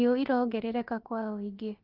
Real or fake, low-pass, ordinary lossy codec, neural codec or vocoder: fake; 5.4 kHz; Opus, 32 kbps; codec, 16 kHz, about 1 kbps, DyCAST, with the encoder's durations